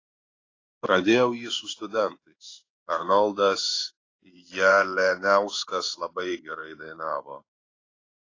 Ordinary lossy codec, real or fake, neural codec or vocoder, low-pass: AAC, 32 kbps; fake; codec, 16 kHz in and 24 kHz out, 1 kbps, XY-Tokenizer; 7.2 kHz